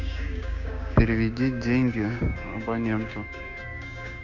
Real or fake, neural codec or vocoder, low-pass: fake; codec, 16 kHz, 6 kbps, DAC; 7.2 kHz